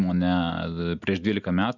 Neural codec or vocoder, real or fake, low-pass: none; real; 7.2 kHz